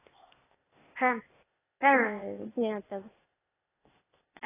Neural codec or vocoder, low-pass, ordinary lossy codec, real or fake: codec, 16 kHz, 0.8 kbps, ZipCodec; 3.6 kHz; AAC, 16 kbps; fake